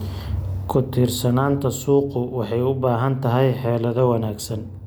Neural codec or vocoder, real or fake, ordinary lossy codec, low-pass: none; real; none; none